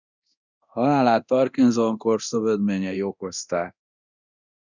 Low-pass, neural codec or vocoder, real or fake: 7.2 kHz; codec, 24 kHz, 0.9 kbps, DualCodec; fake